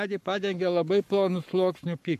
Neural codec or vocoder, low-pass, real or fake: codec, 44.1 kHz, 7.8 kbps, Pupu-Codec; 14.4 kHz; fake